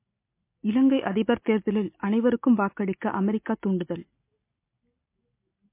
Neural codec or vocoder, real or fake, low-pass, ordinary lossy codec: none; real; 3.6 kHz; MP3, 24 kbps